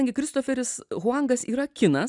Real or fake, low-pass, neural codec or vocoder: real; 10.8 kHz; none